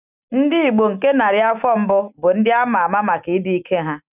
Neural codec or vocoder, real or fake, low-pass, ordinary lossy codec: none; real; 3.6 kHz; none